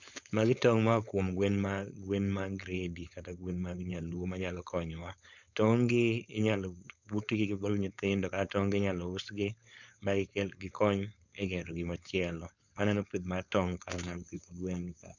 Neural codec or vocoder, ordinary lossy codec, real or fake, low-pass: codec, 16 kHz, 4.8 kbps, FACodec; none; fake; 7.2 kHz